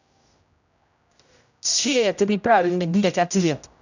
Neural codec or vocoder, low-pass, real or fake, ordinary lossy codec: codec, 16 kHz, 0.5 kbps, X-Codec, HuBERT features, trained on general audio; 7.2 kHz; fake; none